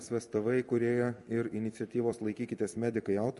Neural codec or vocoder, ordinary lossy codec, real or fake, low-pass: vocoder, 44.1 kHz, 128 mel bands every 256 samples, BigVGAN v2; MP3, 48 kbps; fake; 14.4 kHz